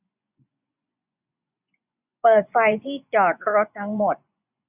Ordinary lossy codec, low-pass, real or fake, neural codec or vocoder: none; 3.6 kHz; fake; vocoder, 24 kHz, 100 mel bands, Vocos